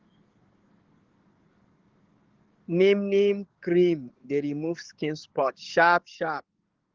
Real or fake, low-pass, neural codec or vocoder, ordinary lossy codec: fake; 7.2 kHz; codec, 44.1 kHz, 7.8 kbps, DAC; Opus, 16 kbps